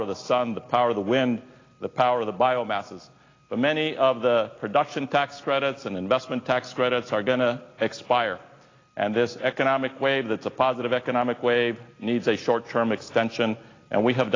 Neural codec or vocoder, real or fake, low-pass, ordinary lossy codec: none; real; 7.2 kHz; AAC, 32 kbps